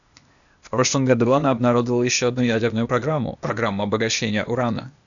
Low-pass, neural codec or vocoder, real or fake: 7.2 kHz; codec, 16 kHz, 0.8 kbps, ZipCodec; fake